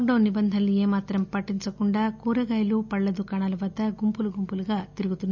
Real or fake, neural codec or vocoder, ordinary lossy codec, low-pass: real; none; none; 7.2 kHz